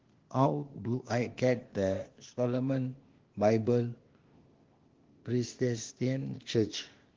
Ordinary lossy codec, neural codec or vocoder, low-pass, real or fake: Opus, 16 kbps; codec, 16 kHz, 0.8 kbps, ZipCodec; 7.2 kHz; fake